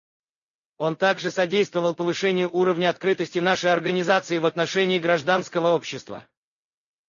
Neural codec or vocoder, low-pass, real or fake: none; 7.2 kHz; real